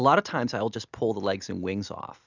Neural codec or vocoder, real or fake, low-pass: none; real; 7.2 kHz